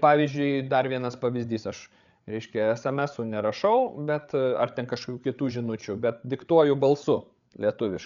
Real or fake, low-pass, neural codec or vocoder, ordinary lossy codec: fake; 7.2 kHz; codec, 16 kHz, 8 kbps, FreqCodec, larger model; MP3, 96 kbps